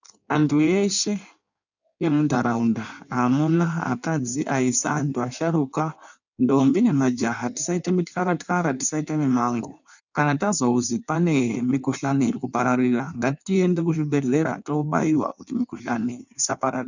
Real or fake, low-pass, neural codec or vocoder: fake; 7.2 kHz; codec, 16 kHz in and 24 kHz out, 1.1 kbps, FireRedTTS-2 codec